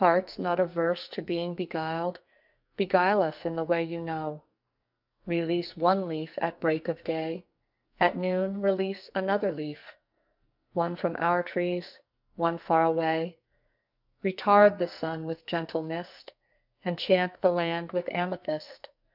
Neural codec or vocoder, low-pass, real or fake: codec, 44.1 kHz, 2.6 kbps, SNAC; 5.4 kHz; fake